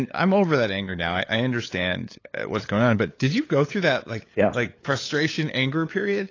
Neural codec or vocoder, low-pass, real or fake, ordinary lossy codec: codec, 16 kHz, 8 kbps, FunCodec, trained on LibriTTS, 25 frames a second; 7.2 kHz; fake; AAC, 32 kbps